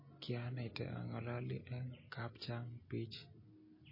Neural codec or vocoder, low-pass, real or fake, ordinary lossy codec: none; 5.4 kHz; real; MP3, 24 kbps